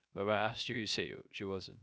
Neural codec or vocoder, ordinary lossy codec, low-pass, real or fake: codec, 16 kHz, 0.3 kbps, FocalCodec; none; none; fake